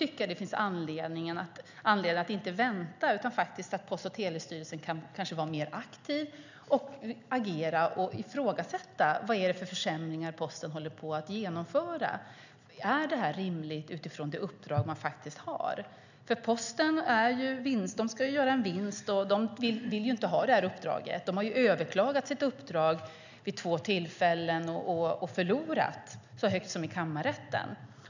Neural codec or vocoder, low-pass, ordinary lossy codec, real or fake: none; 7.2 kHz; none; real